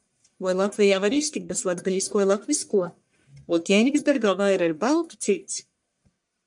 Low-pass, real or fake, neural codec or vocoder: 10.8 kHz; fake; codec, 44.1 kHz, 1.7 kbps, Pupu-Codec